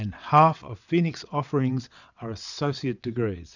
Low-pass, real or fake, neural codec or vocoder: 7.2 kHz; fake; vocoder, 22.05 kHz, 80 mel bands, WaveNeXt